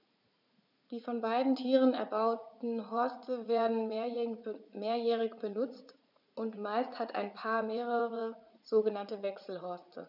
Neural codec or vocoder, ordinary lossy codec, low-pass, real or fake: vocoder, 44.1 kHz, 80 mel bands, Vocos; none; 5.4 kHz; fake